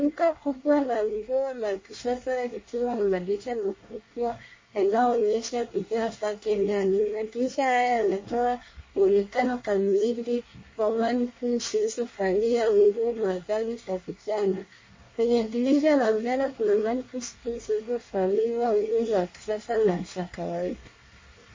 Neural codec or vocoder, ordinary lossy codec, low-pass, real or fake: codec, 24 kHz, 1 kbps, SNAC; MP3, 32 kbps; 7.2 kHz; fake